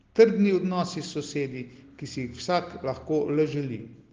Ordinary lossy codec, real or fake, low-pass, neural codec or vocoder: Opus, 16 kbps; real; 7.2 kHz; none